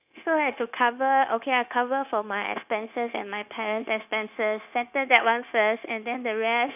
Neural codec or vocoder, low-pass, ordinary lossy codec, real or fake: codec, 16 kHz, 0.9 kbps, LongCat-Audio-Codec; 3.6 kHz; none; fake